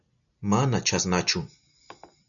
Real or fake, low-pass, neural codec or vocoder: real; 7.2 kHz; none